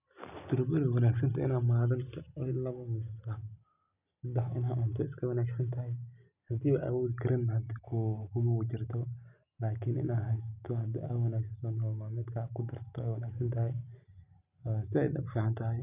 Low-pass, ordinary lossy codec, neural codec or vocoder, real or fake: 3.6 kHz; none; none; real